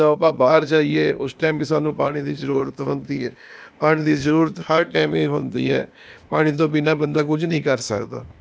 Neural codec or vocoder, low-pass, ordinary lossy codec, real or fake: codec, 16 kHz, 0.8 kbps, ZipCodec; none; none; fake